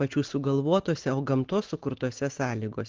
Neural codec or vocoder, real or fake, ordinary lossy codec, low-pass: none; real; Opus, 16 kbps; 7.2 kHz